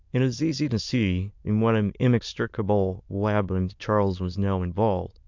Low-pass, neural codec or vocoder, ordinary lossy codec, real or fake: 7.2 kHz; autoencoder, 22.05 kHz, a latent of 192 numbers a frame, VITS, trained on many speakers; MP3, 64 kbps; fake